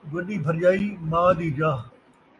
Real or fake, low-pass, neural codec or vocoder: real; 10.8 kHz; none